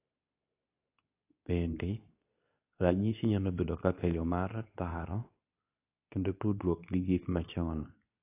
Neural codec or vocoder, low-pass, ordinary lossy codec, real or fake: codec, 24 kHz, 0.9 kbps, WavTokenizer, medium speech release version 2; 3.6 kHz; AAC, 24 kbps; fake